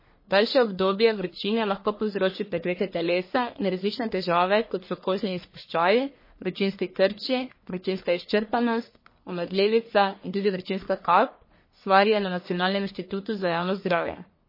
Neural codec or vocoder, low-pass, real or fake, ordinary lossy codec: codec, 44.1 kHz, 1.7 kbps, Pupu-Codec; 5.4 kHz; fake; MP3, 24 kbps